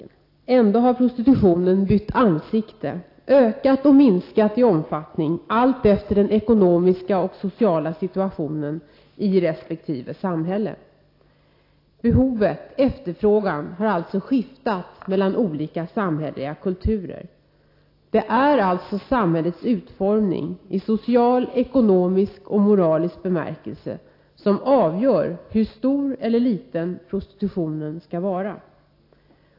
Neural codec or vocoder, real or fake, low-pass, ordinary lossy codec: none; real; 5.4 kHz; AAC, 32 kbps